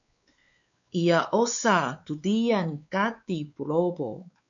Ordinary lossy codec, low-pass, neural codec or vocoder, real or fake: MP3, 96 kbps; 7.2 kHz; codec, 16 kHz, 4 kbps, X-Codec, WavLM features, trained on Multilingual LibriSpeech; fake